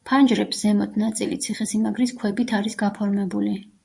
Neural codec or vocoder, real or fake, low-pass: vocoder, 24 kHz, 100 mel bands, Vocos; fake; 10.8 kHz